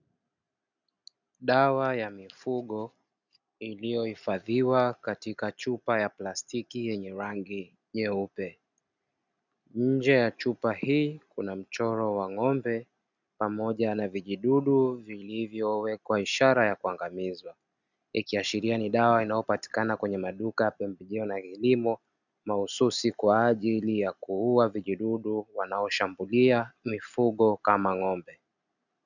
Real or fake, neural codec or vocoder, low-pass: real; none; 7.2 kHz